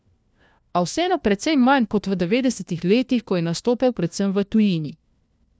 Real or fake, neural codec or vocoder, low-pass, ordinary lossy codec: fake; codec, 16 kHz, 1 kbps, FunCodec, trained on LibriTTS, 50 frames a second; none; none